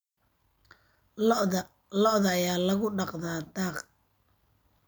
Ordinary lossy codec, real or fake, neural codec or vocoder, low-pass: none; real; none; none